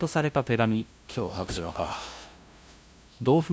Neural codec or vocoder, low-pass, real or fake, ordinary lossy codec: codec, 16 kHz, 0.5 kbps, FunCodec, trained on LibriTTS, 25 frames a second; none; fake; none